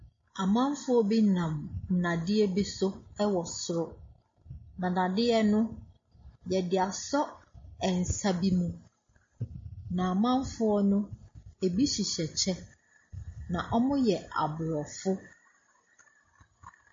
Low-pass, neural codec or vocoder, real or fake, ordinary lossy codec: 7.2 kHz; none; real; MP3, 32 kbps